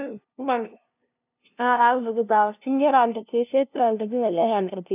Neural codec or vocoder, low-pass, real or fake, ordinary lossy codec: codec, 16 kHz, 0.5 kbps, FunCodec, trained on LibriTTS, 25 frames a second; 3.6 kHz; fake; none